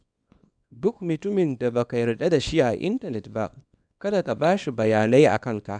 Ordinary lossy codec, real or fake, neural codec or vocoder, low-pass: none; fake; codec, 24 kHz, 0.9 kbps, WavTokenizer, small release; 9.9 kHz